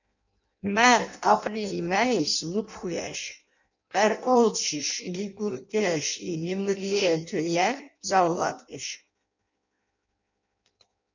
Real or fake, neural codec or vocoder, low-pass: fake; codec, 16 kHz in and 24 kHz out, 0.6 kbps, FireRedTTS-2 codec; 7.2 kHz